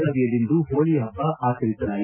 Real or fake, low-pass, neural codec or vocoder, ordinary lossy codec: real; 3.6 kHz; none; none